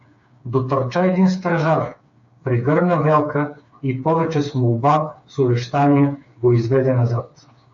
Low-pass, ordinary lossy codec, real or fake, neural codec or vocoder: 7.2 kHz; AAC, 64 kbps; fake; codec, 16 kHz, 4 kbps, FreqCodec, smaller model